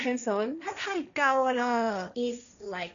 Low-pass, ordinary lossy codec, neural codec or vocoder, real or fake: 7.2 kHz; none; codec, 16 kHz, 1.1 kbps, Voila-Tokenizer; fake